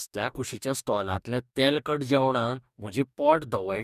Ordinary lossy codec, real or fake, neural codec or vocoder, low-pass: none; fake; codec, 44.1 kHz, 2.6 kbps, DAC; 14.4 kHz